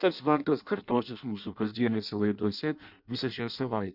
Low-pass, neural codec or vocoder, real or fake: 5.4 kHz; codec, 16 kHz in and 24 kHz out, 0.6 kbps, FireRedTTS-2 codec; fake